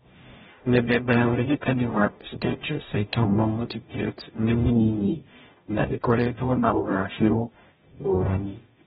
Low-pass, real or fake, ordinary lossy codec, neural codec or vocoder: 19.8 kHz; fake; AAC, 16 kbps; codec, 44.1 kHz, 0.9 kbps, DAC